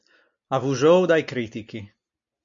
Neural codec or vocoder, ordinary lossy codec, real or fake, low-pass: none; MP3, 64 kbps; real; 7.2 kHz